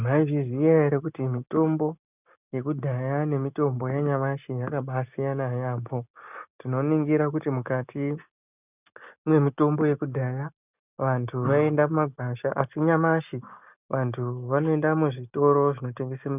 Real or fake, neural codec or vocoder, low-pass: real; none; 3.6 kHz